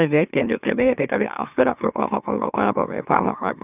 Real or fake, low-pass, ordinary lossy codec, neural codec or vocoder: fake; 3.6 kHz; none; autoencoder, 44.1 kHz, a latent of 192 numbers a frame, MeloTTS